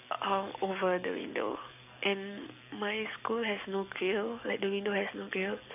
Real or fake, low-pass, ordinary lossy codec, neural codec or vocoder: fake; 3.6 kHz; none; codec, 44.1 kHz, 7.8 kbps, DAC